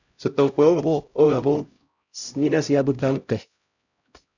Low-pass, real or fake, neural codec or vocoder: 7.2 kHz; fake; codec, 16 kHz, 0.5 kbps, X-Codec, HuBERT features, trained on LibriSpeech